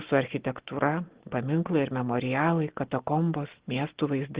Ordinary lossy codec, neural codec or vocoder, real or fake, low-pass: Opus, 16 kbps; none; real; 3.6 kHz